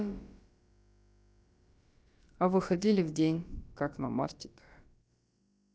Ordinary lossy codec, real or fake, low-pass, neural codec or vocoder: none; fake; none; codec, 16 kHz, about 1 kbps, DyCAST, with the encoder's durations